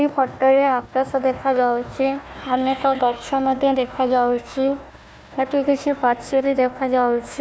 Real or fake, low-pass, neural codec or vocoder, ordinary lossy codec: fake; none; codec, 16 kHz, 1 kbps, FunCodec, trained on Chinese and English, 50 frames a second; none